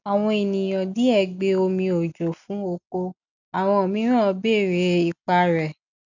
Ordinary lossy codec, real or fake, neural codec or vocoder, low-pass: none; real; none; 7.2 kHz